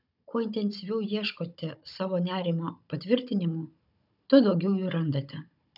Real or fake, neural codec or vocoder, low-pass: fake; codec, 16 kHz, 16 kbps, FunCodec, trained on Chinese and English, 50 frames a second; 5.4 kHz